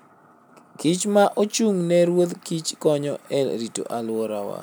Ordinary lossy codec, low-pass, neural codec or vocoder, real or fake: none; none; none; real